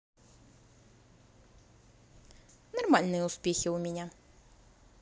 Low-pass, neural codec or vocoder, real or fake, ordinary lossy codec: none; none; real; none